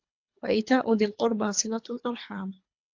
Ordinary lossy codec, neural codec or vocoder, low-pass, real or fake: AAC, 48 kbps; codec, 24 kHz, 3 kbps, HILCodec; 7.2 kHz; fake